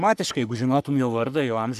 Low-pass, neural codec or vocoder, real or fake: 14.4 kHz; codec, 44.1 kHz, 3.4 kbps, Pupu-Codec; fake